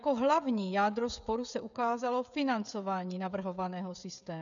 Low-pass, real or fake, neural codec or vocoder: 7.2 kHz; fake; codec, 16 kHz, 16 kbps, FreqCodec, smaller model